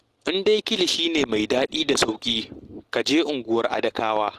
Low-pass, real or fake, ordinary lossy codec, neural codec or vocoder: 14.4 kHz; real; Opus, 16 kbps; none